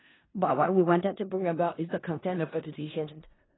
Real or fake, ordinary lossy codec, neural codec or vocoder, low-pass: fake; AAC, 16 kbps; codec, 16 kHz in and 24 kHz out, 0.4 kbps, LongCat-Audio-Codec, four codebook decoder; 7.2 kHz